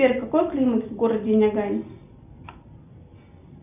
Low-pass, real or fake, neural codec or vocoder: 3.6 kHz; real; none